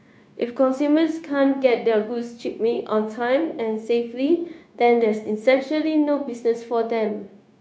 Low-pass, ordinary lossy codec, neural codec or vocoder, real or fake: none; none; codec, 16 kHz, 0.9 kbps, LongCat-Audio-Codec; fake